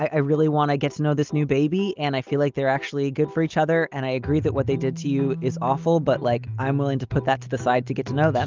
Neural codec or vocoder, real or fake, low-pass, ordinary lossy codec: none; real; 7.2 kHz; Opus, 24 kbps